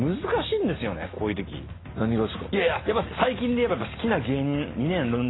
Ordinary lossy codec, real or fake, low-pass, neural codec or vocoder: AAC, 16 kbps; real; 7.2 kHz; none